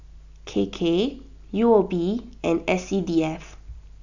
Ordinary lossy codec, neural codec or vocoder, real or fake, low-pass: none; none; real; 7.2 kHz